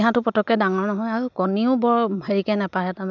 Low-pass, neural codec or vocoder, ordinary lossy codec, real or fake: 7.2 kHz; none; none; real